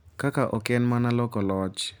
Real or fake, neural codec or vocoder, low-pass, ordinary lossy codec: real; none; none; none